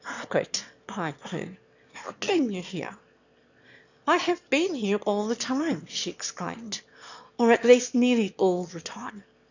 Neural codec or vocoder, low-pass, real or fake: autoencoder, 22.05 kHz, a latent of 192 numbers a frame, VITS, trained on one speaker; 7.2 kHz; fake